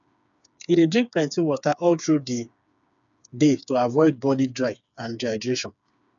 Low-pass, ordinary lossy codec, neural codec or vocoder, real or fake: 7.2 kHz; none; codec, 16 kHz, 4 kbps, FreqCodec, smaller model; fake